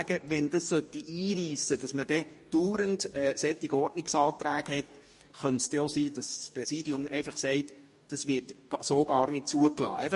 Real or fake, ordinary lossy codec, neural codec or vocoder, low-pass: fake; MP3, 48 kbps; codec, 44.1 kHz, 2.6 kbps, DAC; 14.4 kHz